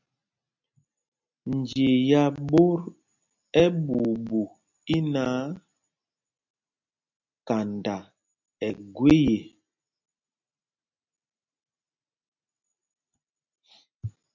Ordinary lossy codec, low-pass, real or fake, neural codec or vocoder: MP3, 64 kbps; 7.2 kHz; real; none